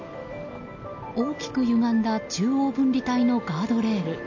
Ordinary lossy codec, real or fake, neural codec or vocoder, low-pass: MP3, 48 kbps; real; none; 7.2 kHz